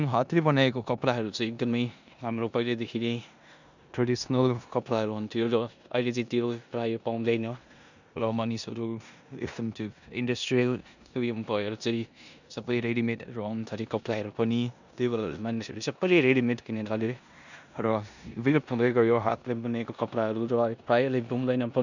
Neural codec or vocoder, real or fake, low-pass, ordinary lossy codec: codec, 16 kHz in and 24 kHz out, 0.9 kbps, LongCat-Audio-Codec, four codebook decoder; fake; 7.2 kHz; none